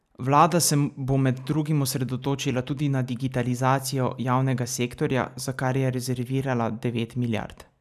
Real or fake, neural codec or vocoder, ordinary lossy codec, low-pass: real; none; none; 14.4 kHz